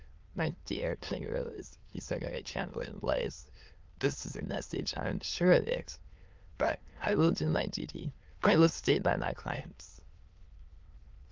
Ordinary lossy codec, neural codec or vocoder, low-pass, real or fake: Opus, 32 kbps; autoencoder, 22.05 kHz, a latent of 192 numbers a frame, VITS, trained on many speakers; 7.2 kHz; fake